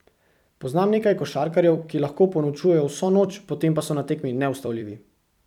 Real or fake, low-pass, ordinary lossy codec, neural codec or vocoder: fake; 19.8 kHz; none; vocoder, 44.1 kHz, 128 mel bands every 256 samples, BigVGAN v2